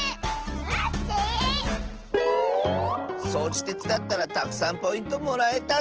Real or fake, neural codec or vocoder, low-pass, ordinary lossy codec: real; none; 7.2 kHz; Opus, 16 kbps